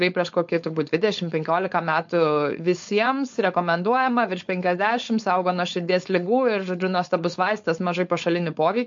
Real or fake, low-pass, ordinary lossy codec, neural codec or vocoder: fake; 7.2 kHz; MP3, 48 kbps; codec, 16 kHz, 4.8 kbps, FACodec